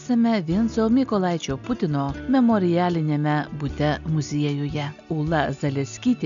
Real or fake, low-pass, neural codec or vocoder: real; 7.2 kHz; none